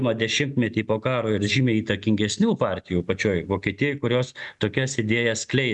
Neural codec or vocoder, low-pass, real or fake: none; 10.8 kHz; real